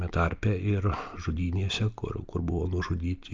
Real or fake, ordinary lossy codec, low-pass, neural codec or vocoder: real; Opus, 24 kbps; 7.2 kHz; none